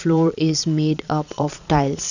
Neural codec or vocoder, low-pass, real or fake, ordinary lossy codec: vocoder, 22.05 kHz, 80 mel bands, Vocos; 7.2 kHz; fake; none